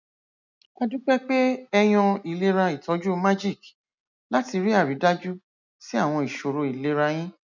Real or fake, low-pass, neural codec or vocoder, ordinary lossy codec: real; 7.2 kHz; none; none